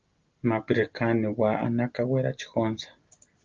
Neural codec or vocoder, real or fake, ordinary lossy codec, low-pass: none; real; Opus, 24 kbps; 7.2 kHz